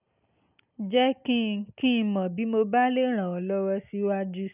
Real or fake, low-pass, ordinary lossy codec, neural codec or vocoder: real; 3.6 kHz; none; none